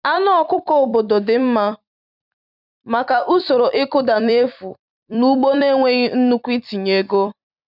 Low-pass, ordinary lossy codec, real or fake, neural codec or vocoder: 5.4 kHz; none; fake; vocoder, 44.1 kHz, 128 mel bands every 256 samples, BigVGAN v2